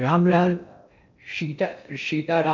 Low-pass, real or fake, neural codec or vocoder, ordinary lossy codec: 7.2 kHz; fake; codec, 16 kHz in and 24 kHz out, 0.6 kbps, FocalCodec, streaming, 4096 codes; none